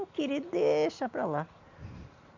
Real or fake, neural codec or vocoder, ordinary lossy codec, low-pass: real; none; MP3, 64 kbps; 7.2 kHz